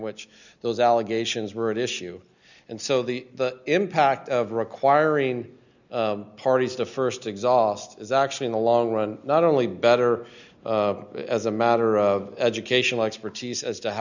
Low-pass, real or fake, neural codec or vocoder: 7.2 kHz; real; none